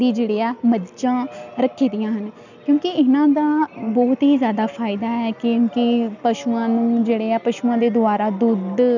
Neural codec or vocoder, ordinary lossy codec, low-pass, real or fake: none; none; 7.2 kHz; real